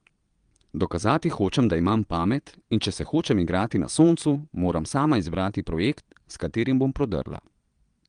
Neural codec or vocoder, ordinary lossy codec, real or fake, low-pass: vocoder, 22.05 kHz, 80 mel bands, Vocos; Opus, 32 kbps; fake; 9.9 kHz